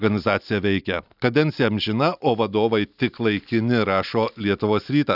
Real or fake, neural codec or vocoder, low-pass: real; none; 5.4 kHz